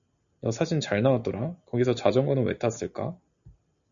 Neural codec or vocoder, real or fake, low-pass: none; real; 7.2 kHz